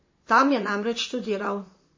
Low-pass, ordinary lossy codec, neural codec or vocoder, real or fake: 7.2 kHz; MP3, 32 kbps; vocoder, 44.1 kHz, 128 mel bands, Pupu-Vocoder; fake